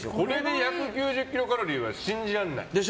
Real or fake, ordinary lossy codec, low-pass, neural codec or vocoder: real; none; none; none